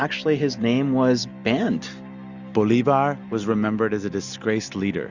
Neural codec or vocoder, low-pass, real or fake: none; 7.2 kHz; real